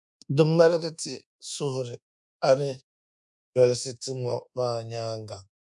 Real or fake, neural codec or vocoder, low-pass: fake; codec, 24 kHz, 1.2 kbps, DualCodec; 10.8 kHz